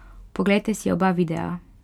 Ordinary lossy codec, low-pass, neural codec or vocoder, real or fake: none; 19.8 kHz; none; real